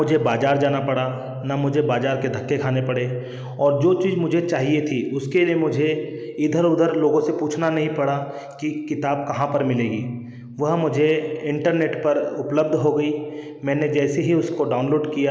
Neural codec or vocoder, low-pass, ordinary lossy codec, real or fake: none; none; none; real